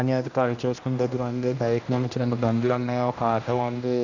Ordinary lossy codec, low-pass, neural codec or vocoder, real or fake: none; 7.2 kHz; codec, 16 kHz, 1 kbps, X-Codec, HuBERT features, trained on general audio; fake